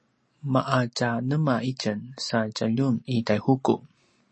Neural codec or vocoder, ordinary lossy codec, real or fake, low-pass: none; MP3, 32 kbps; real; 10.8 kHz